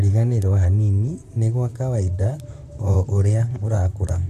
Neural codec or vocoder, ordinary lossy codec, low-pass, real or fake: vocoder, 44.1 kHz, 128 mel bands, Pupu-Vocoder; none; 14.4 kHz; fake